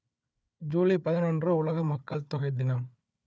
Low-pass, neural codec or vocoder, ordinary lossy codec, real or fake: none; codec, 16 kHz, 4 kbps, FunCodec, trained on Chinese and English, 50 frames a second; none; fake